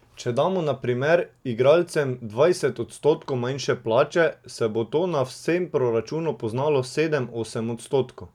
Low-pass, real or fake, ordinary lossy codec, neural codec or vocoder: 19.8 kHz; real; none; none